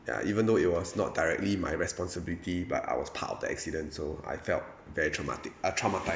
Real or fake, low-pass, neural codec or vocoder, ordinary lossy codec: real; none; none; none